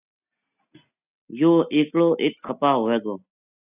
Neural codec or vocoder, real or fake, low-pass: none; real; 3.6 kHz